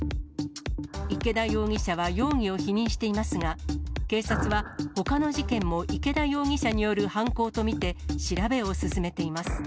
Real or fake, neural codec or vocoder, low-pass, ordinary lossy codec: real; none; none; none